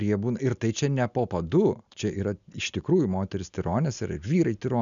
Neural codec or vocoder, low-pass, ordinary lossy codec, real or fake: none; 7.2 kHz; MP3, 96 kbps; real